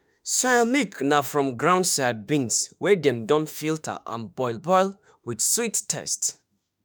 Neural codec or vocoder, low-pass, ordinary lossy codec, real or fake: autoencoder, 48 kHz, 32 numbers a frame, DAC-VAE, trained on Japanese speech; none; none; fake